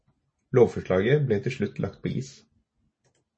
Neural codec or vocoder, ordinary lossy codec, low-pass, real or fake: none; MP3, 32 kbps; 9.9 kHz; real